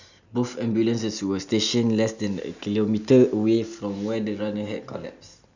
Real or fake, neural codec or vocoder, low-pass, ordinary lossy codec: real; none; 7.2 kHz; none